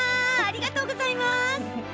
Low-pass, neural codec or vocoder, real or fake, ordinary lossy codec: none; none; real; none